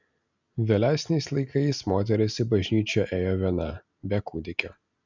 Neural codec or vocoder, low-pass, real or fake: none; 7.2 kHz; real